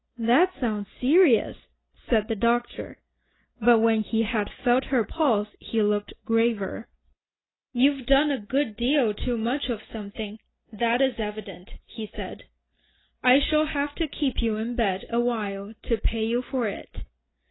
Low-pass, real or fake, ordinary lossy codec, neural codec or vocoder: 7.2 kHz; real; AAC, 16 kbps; none